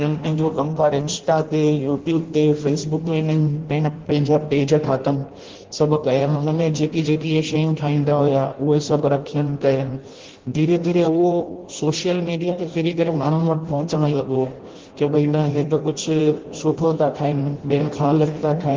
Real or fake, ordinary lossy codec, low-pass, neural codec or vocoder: fake; Opus, 16 kbps; 7.2 kHz; codec, 16 kHz in and 24 kHz out, 0.6 kbps, FireRedTTS-2 codec